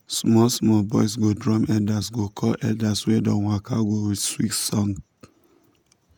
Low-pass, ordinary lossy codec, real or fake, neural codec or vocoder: none; none; fake; vocoder, 48 kHz, 128 mel bands, Vocos